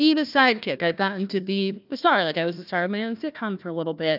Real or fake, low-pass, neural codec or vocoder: fake; 5.4 kHz; codec, 16 kHz, 1 kbps, FunCodec, trained on Chinese and English, 50 frames a second